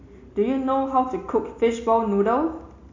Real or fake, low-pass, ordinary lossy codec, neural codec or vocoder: real; 7.2 kHz; AAC, 48 kbps; none